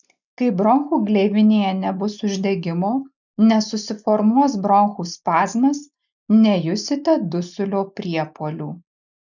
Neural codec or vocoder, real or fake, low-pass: none; real; 7.2 kHz